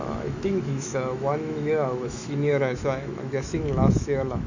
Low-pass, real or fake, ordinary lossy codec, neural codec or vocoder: 7.2 kHz; fake; none; autoencoder, 48 kHz, 128 numbers a frame, DAC-VAE, trained on Japanese speech